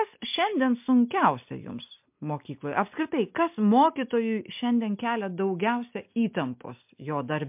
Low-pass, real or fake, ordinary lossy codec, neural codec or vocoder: 3.6 kHz; real; MP3, 32 kbps; none